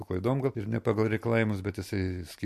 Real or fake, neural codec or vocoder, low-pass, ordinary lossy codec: real; none; 14.4 kHz; MP3, 64 kbps